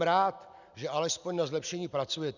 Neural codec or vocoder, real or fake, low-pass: none; real; 7.2 kHz